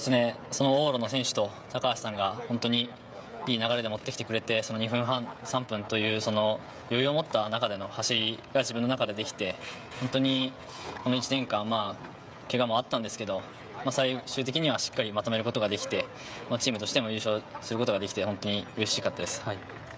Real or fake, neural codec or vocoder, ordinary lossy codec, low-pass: fake; codec, 16 kHz, 16 kbps, FreqCodec, smaller model; none; none